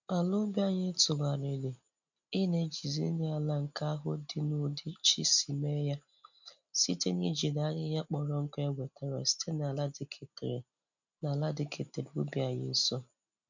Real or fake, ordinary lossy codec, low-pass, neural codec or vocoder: real; none; 7.2 kHz; none